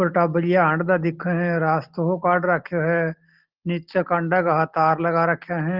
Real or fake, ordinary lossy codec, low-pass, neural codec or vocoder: real; Opus, 16 kbps; 5.4 kHz; none